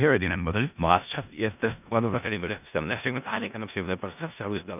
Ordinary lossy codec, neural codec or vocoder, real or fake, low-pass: none; codec, 16 kHz in and 24 kHz out, 0.4 kbps, LongCat-Audio-Codec, four codebook decoder; fake; 3.6 kHz